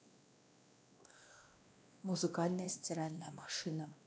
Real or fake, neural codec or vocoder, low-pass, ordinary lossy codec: fake; codec, 16 kHz, 1 kbps, X-Codec, WavLM features, trained on Multilingual LibriSpeech; none; none